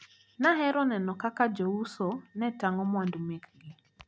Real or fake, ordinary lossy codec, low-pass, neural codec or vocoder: real; none; none; none